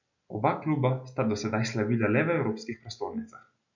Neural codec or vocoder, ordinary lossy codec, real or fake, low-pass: none; none; real; 7.2 kHz